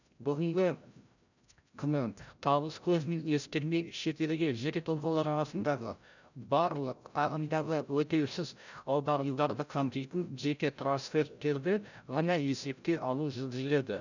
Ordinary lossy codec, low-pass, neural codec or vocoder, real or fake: none; 7.2 kHz; codec, 16 kHz, 0.5 kbps, FreqCodec, larger model; fake